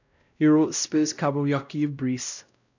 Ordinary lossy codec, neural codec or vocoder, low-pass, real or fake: none; codec, 16 kHz, 0.5 kbps, X-Codec, WavLM features, trained on Multilingual LibriSpeech; 7.2 kHz; fake